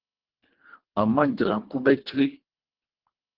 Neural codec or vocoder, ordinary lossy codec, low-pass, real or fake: codec, 24 kHz, 1.5 kbps, HILCodec; Opus, 16 kbps; 5.4 kHz; fake